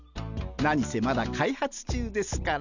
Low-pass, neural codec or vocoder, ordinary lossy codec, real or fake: 7.2 kHz; none; none; real